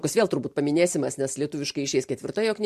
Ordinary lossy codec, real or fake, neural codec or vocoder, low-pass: MP3, 64 kbps; real; none; 14.4 kHz